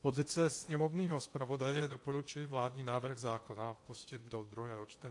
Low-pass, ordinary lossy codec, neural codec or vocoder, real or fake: 10.8 kHz; AAC, 48 kbps; codec, 16 kHz in and 24 kHz out, 0.8 kbps, FocalCodec, streaming, 65536 codes; fake